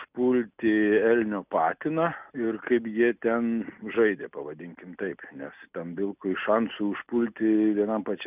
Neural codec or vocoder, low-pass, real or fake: none; 3.6 kHz; real